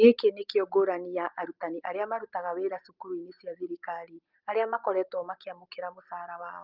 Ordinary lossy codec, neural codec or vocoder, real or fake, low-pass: Opus, 32 kbps; none; real; 5.4 kHz